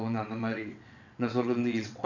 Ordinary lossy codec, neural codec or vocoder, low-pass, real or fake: none; vocoder, 22.05 kHz, 80 mel bands, WaveNeXt; 7.2 kHz; fake